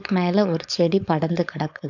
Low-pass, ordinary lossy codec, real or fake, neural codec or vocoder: 7.2 kHz; none; fake; codec, 16 kHz, 4.8 kbps, FACodec